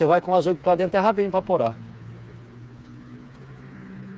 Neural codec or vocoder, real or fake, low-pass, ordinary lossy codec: codec, 16 kHz, 4 kbps, FreqCodec, smaller model; fake; none; none